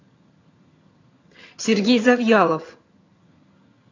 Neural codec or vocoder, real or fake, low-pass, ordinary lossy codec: vocoder, 22.05 kHz, 80 mel bands, HiFi-GAN; fake; 7.2 kHz; AAC, 32 kbps